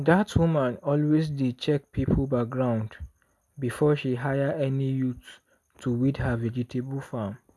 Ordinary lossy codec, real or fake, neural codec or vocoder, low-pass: none; real; none; none